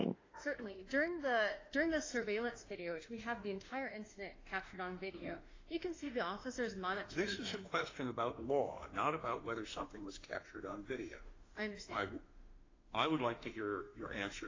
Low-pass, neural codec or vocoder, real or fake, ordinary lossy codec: 7.2 kHz; autoencoder, 48 kHz, 32 numbers a frame, DAC-VAE, trained on Japanese speech; fake; AAC, 32 kbps